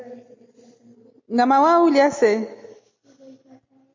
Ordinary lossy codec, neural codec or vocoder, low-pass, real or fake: MP3, 32 kbps; none; 7.2 kHz; real